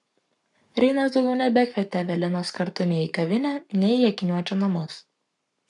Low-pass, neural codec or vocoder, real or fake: 10.8 kHz; codec, 44.1 kHz, 7.8 kbps, Pupu-Codec; fake